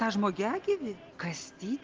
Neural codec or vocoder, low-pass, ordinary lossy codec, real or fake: none; 7.2 kHz; Opus, 16 kbps; real